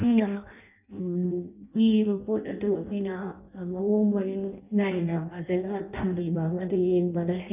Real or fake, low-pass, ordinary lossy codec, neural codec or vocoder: fake; 3.6 kHz; none; codec, 16 kHz in and 24 kHz out, 0.6 kbps, FireRedTTS-2 codec